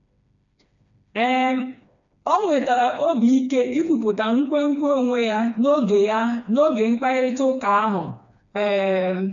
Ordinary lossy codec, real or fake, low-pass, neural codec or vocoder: none; fake; 7.2 kHz; codec, 16 kHz, 2 kbps, FreqCodec, smaller model